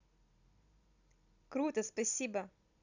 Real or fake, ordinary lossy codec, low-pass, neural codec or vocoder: real; none; 7.2 kHz; none